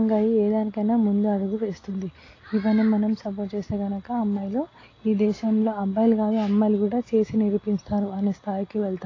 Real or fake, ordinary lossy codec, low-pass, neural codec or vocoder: real; AAC, 32 kbps; 7.2 kHz; none